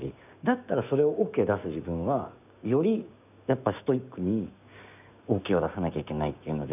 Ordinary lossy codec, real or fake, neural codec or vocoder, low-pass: none; fake; codec, 16 kHz, 6 kbps, DAC; 3.6 kHz